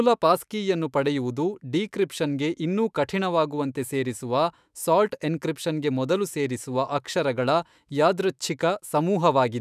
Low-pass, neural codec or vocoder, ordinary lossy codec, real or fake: 14.4 kHz; autoencoder, 48 kHz, 128 numbers a frame, DAC-VAE, trained on Japanese speech; none; fake